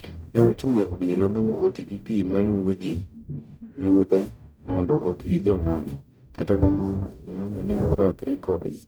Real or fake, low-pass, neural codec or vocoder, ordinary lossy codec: fake; none; codec, 44.1 kHz, 0.9 kbps, DAC; none